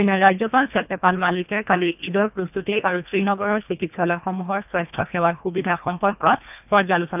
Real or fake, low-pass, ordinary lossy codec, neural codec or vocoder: fake; 3.6 kHz; none; codec, 24 kHz, 1.5 kbps, HILCodec